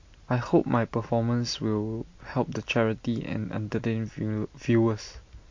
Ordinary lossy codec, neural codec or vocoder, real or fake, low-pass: MP3, 48 kbps; none; real; 7.2 kHz